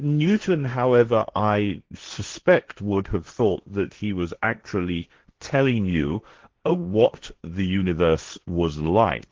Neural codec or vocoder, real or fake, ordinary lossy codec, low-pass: codec, 16 kHz, 1.1 kbps, Voila-Tokenizer; fake; Opus, 16 kbps; 7.2 kHz